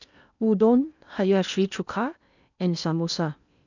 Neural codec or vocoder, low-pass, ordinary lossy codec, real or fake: codec, 16 kHz in and 24 kHz out, 0.8 kbps, FocalCodec, streaming, 65536 codes; 7.2 kHz; none; fake